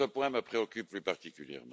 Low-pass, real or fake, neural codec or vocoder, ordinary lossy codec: none; real; none; none